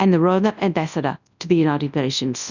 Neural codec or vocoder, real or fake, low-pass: codec, 24 kHz, 0.9 kbps, WavTokenizer, large speech release; fake; 7.2 kHz